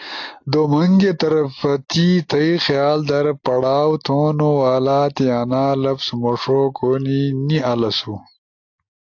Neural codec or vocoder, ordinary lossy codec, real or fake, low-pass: none; AAC, 48 kbps; real; 7.2 kHz